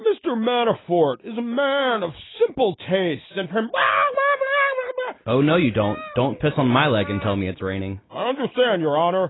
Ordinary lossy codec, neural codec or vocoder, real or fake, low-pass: AAC, 16 kbps; none; real; 7.2 kHz